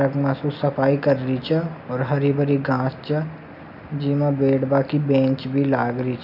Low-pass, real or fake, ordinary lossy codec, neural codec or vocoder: 5.4 kHz; real; none; none